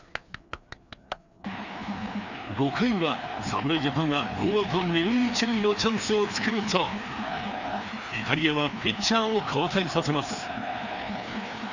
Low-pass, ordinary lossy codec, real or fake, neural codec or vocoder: 7.2 kHz; none; fake; codec, 16 kHz, 2 kbps, FreqCodec, larger model